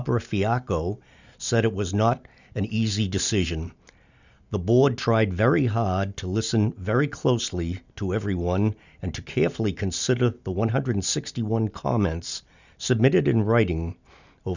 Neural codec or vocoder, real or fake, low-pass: none; real; 7.2 kHz